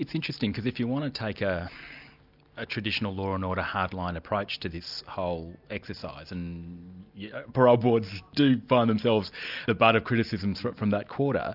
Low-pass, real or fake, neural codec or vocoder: 5.4 kHz; real; none